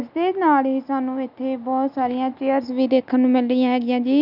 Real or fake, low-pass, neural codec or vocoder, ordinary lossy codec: real; 5.4 kHz; none; none